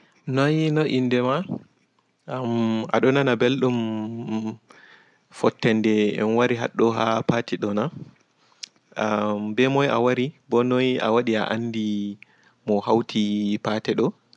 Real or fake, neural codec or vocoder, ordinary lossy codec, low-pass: real; none; none; 10.8 kHz